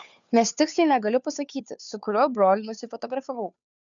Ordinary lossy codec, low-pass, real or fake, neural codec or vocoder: MP3, 96 kbps; 7.2 kHz; fake; codec, 16 kHz, 2 kbps, FunCodec, trained on Chinese and English, 25 frames a second